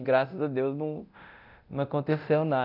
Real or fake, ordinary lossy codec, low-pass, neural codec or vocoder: fake; none; 5.4 kHz; codec, 24 kHz, 0.9 kbps, DualCodec